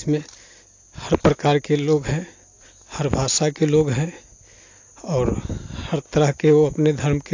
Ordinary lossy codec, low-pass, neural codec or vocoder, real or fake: AAC, 48 kbps; 7.2 kHz; none; real